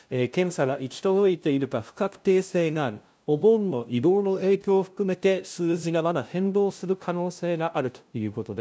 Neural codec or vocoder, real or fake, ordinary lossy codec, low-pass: codec, 16 kHz, 0.5 kbps, FunCodec, trained on LibriTTS, 25 frames a second; fake; none; none